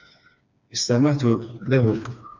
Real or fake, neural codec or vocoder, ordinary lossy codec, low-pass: fake; codec, 16 kHz, 2 kbps, FreqCodec, smaller model; MP3, 64 kbps; 7.2 kHz